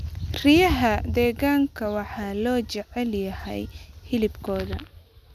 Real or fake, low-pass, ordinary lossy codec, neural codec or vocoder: real; 14.4 kHz; none; none